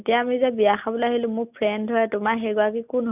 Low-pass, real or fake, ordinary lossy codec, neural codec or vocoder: 3.6 kHz; real; none; none